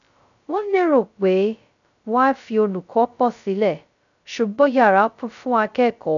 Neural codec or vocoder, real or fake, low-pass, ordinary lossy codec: codec, 16 kHz, 0.2 kbps, FocalCodec; fake; 7.2 kHz; none